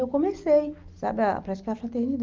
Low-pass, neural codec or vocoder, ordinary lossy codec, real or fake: 7.2 kHz; none; Opus, 32 kbps; real